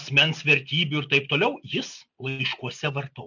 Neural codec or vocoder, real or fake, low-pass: none; real; 7.2 kHz